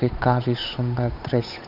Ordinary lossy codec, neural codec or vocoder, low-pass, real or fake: none; codec, 16 kHz, 8 kbps, FunCodec, trained on Chinese and English, 25 frames a second; 5.4 kHz; fake